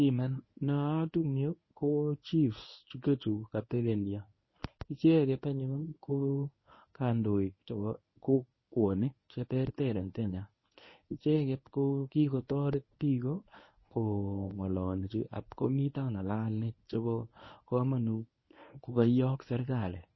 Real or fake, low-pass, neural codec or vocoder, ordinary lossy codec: fake; 7.2 kHz; codec, 24 kHz, 0.9 kbps, WavTokenizer, medium speech release version 1; MP3, 24 kbps